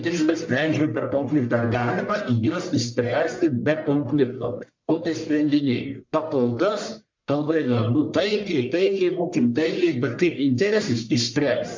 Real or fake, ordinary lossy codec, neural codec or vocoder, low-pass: fake; MP3, 64 kbps; codec, 44.1 kHz, 1.7 kbps, Pupu-Codec; 7.2 kHz